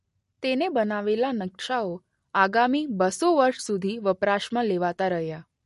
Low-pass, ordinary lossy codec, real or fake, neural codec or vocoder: 14.4 kHz; MP3, 48 kbps; real; none